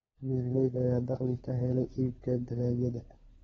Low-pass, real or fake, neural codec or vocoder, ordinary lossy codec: 7.2 kHz; fake; codec, 16 kHz, 4 kbps, FunCodec, trained on LibriTTS, 50 frames a second; AAC, 24 kbps